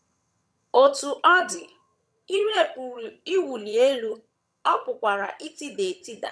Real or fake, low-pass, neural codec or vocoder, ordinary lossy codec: fake; none; vocoder, 22.05 kHz, 80 mel bands, HiFi-GAN; none